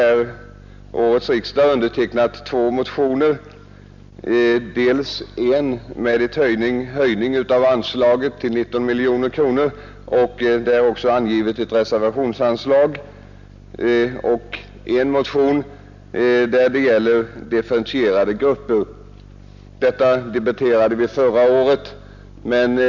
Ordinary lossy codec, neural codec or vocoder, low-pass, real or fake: none; none; 7.2 kHz; real